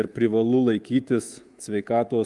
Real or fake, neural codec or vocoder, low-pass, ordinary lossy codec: fake; codec, 24 kHz, 3.1 kbps, DualCodec; 10.8 kHz; Opus, 24 kbps